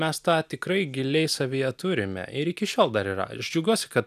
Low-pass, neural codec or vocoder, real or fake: 14.4 kHz; none; real